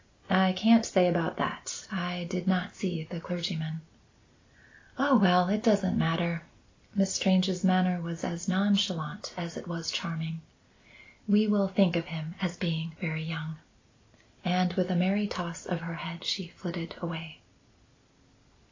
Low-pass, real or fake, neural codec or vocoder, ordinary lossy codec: 7.2 kHz; real; none; AAC, 32 kbps